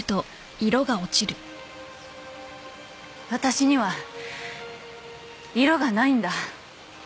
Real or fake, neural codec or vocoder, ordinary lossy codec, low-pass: real; none; none; none